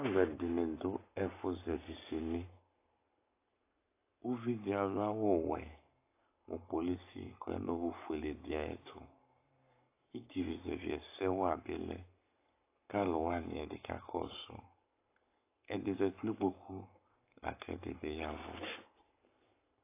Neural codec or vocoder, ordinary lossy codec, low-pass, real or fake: codec, 44.1 kHz, 7.8 kbps, Pupu-Codec; AAC, 24 kbps; 3.6 kHz; fake